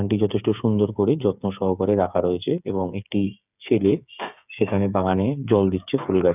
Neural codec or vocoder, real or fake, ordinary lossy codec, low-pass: codec, 16 kHz, 8 kbps, FreqCodec, smaller model; fake; none; 3.6 kHz